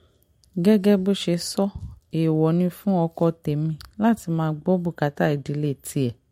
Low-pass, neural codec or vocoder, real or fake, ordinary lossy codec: 19.8 kHz; none; real; MP3, 64 kbps